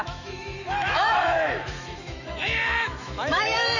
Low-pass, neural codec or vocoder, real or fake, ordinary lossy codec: 7.2 kHz; autoencoder, 48 kHz, 128 numbers a frame, DAC-VAE, trained on Japanese speech; fake; none